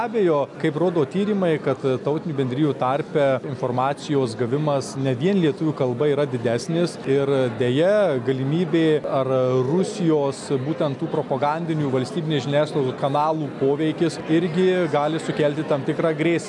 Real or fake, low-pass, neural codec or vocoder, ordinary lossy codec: real; 10.8 kHz; none; MP3, 96 kbps